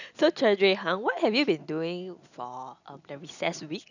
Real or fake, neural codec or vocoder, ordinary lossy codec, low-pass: real; none; none; 7.2 kHz